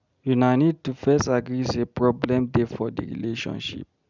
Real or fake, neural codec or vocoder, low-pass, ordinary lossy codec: real; none; 7.2 kHz; none